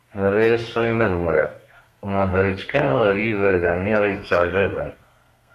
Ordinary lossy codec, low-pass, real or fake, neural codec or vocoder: MP3, 64 kbps; 14.4 kHz; fake; codec, 32 kHz, 1.9 kbps, SNAC